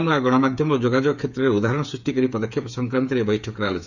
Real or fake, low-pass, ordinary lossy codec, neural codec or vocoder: fake; 7.2 kHz; none; codec, 16 kHz, 8 kbps, FreqCodec, smaller model